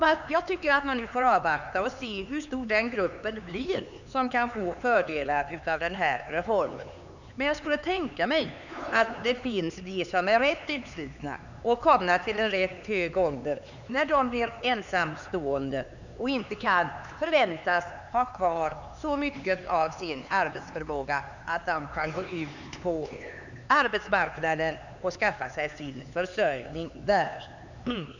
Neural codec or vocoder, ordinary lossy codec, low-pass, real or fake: codec, 16 kHz, 4 kbps, X-Codec, HuBERT features, trained on LibriSpeech; none; 7.2 kHz; fake